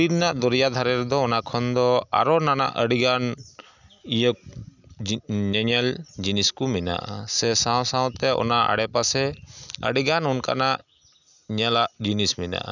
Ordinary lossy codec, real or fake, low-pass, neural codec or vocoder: none; real; 7.2 kHz; none